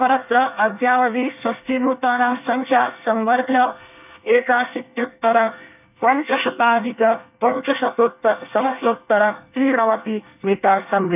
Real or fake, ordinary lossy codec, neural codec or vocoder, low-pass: fake; none; codec, 24 kHz, 1 kbps, SNAC; 3.6 kHz